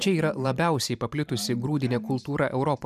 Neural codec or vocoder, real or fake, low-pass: vocoder, 44.1 kHz, 128 mel bands every 512 samples, BigVGAN v2; fake; 14.4 kHz